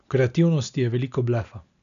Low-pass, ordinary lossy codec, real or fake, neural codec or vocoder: 7.2 kHz; none; real; none